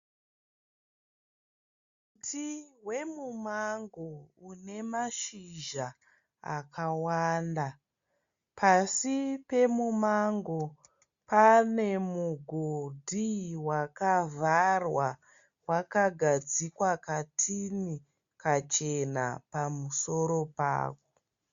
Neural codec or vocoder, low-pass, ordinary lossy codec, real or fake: none; 7.2 kHz; Opus, 64 kbps; real